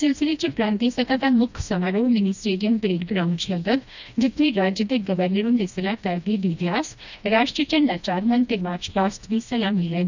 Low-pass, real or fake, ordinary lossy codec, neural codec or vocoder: 7.2 kHz; fake; none; codec, 16 kHz, 1 kbps, FreqCodec, smaller model